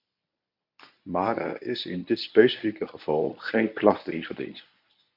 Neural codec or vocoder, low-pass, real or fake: codec, 24 kHz, 0.9 kbps, WavTokenizer, medium speech release version 1; 5.4 kHz; fake